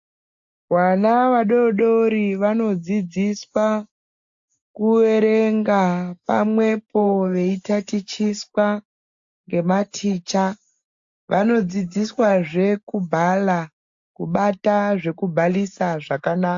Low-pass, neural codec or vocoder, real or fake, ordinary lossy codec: 7.2 kHz; none; real; AAC, 64 kbps